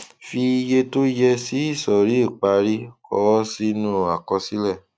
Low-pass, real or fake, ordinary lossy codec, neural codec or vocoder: none; real; none; none